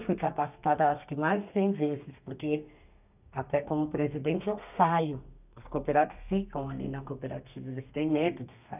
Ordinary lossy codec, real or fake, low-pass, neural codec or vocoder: none; fake; 3.6 kHz; codec, 32 kHz, 1.9 kbps, SNAC